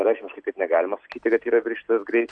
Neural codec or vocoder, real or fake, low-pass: none; real; 9.9 kHz